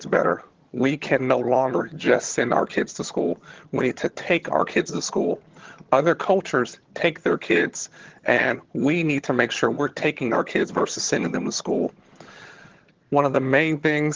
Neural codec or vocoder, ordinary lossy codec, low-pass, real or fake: vocoder, 22.05 kHz, 80 mel bands, HiFi-GAN; Opus, 16 kbps; 7.2 kHz; fake